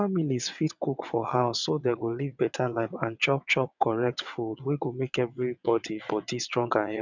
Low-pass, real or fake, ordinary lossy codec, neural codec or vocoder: 7.2 kHz; fake; none; vocoder, 22.05 kHz, 80 mel bands, WaveNeXt